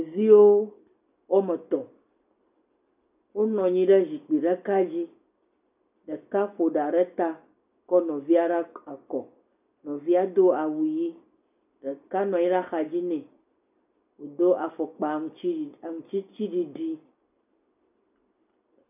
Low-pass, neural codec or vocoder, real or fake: 3.6 kHz; none; real